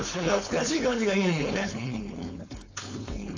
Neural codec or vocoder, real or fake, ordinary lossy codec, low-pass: codec, 16 kHz, 4.8 kbps, FACodec; fake; AAC, 48 kbps; 7.2 kHz